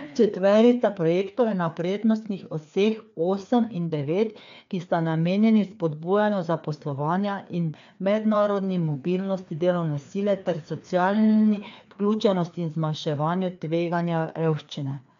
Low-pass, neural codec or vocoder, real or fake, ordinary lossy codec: 7.2 kHz; codec, 16 kHz, 2 kbps, FreqCodec, larger model; fake; MP3, 64 kbps